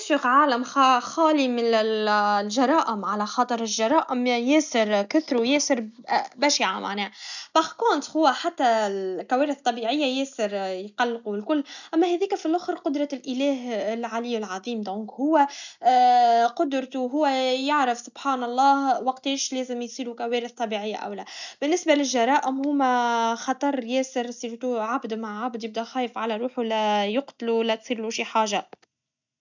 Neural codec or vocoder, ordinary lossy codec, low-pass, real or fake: none; none; 7.2 kHz; real